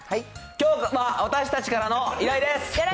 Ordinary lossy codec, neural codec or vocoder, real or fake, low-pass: none; none; real; none